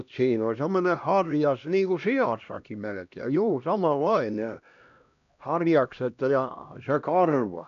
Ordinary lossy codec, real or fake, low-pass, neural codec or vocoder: none; fake; 7.2 kHz; codec, 16 kHz, 1 kbps, X-Codec, HuBERT features, trained on LibriSpeech